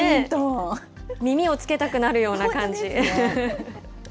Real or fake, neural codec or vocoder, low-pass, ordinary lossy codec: real; none; none; none